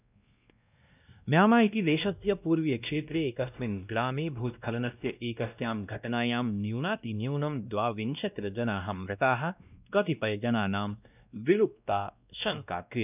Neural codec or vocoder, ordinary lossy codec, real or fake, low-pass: codec, 16 kHz, 1 kbps, X-Codec, WavLM features, trained on Multilingual LibriSpeech; none; fake; 3.6 kHz